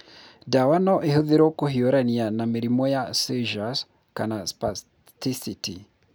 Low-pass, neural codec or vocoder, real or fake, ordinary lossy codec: none; none; real; none